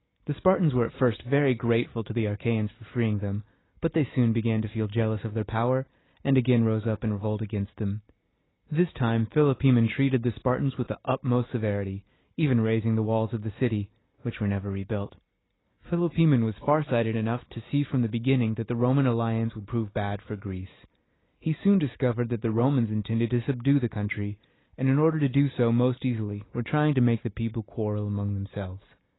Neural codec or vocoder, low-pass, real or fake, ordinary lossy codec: none; 7.2 kHz; real; AAC, 16 kbps